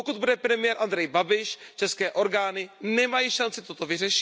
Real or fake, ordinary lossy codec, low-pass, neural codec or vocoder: real; none; none; none